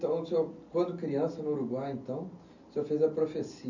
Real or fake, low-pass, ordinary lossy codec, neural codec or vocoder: real; 7.2 kHz; none; none